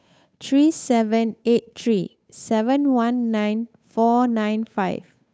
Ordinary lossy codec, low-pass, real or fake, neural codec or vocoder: none; none; fake; codec, 16 kHz, 8 kbps, FunCodec, trained on Chinese and English, 25 frames a second